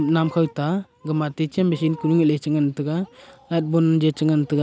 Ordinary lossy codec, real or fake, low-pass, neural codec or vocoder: none; real; none; none